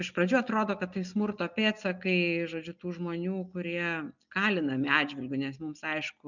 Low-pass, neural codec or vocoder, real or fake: 7.2 kHz; none; real